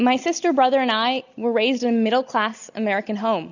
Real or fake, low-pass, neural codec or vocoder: real; 7.2 kHz; none